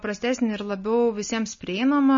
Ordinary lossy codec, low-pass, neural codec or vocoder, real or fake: MP3, 32 kbps; 7.2 kHz; none; real